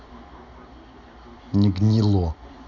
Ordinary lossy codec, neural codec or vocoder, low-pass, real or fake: none; none; 7.2 kHz; real